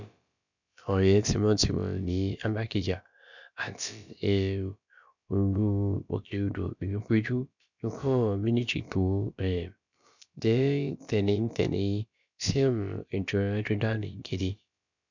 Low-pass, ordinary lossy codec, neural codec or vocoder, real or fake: 7.2 kHz; none; codec, 16 kHz, about 1 kbps, DyCAST, with the encoder's durations; fake